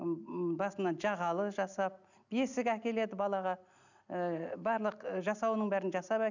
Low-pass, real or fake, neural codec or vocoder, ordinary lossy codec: 7.2 kHz; real; none; none